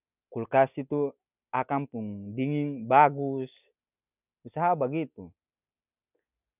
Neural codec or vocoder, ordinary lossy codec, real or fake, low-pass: none; none; real; 3.6 kHz